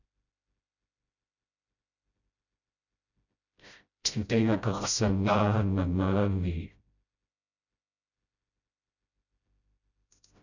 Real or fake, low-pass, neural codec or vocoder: fake; 7.2 kHz; codec, 16 kHz, 0.5 kbps, FreqCodec, smaller model